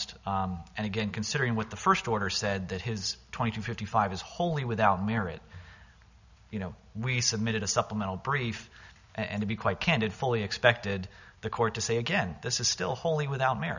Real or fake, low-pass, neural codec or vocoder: real; 7.2 kHz; none